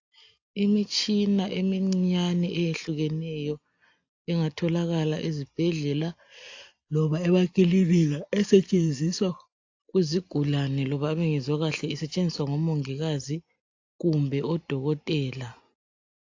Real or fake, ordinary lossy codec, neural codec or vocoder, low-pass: real; AAC, 48 kbps; none; 7.2 kHz